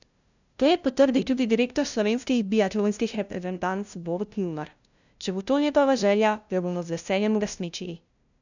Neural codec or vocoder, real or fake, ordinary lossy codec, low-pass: codec, 16 kHz, 0.5 kbps, FunCodec, trained on LibriTTS, 25 frames a second; fake; none; 7.2 kHz